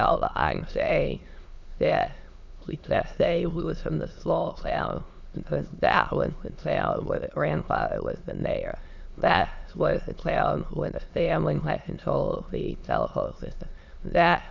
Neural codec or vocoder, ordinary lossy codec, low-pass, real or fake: autoencoder, 22.05 kHz, a latent of 192 numbers a frame, VITS, trained on many speakers; Opus, 64 kbps; 7.2 kHz; fake